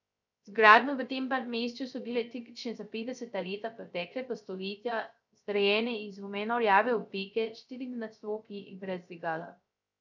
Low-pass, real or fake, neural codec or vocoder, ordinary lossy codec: 7.2 kHz; fake; codec, 16 kHz, 0.3 kbps, FocalCodec; none